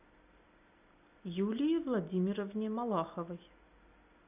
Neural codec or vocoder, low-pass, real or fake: none; 3.6 kHz; real